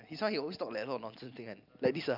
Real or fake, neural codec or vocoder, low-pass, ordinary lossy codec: real; none; 5.4 kHz; none